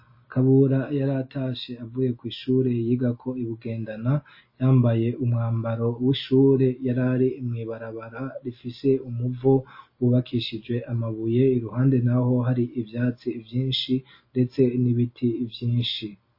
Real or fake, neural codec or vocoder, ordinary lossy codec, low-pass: real; none; MP3, 24 kbps; 5.4 kHz